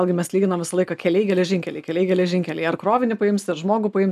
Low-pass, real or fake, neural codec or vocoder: 14.4 kHz; real; none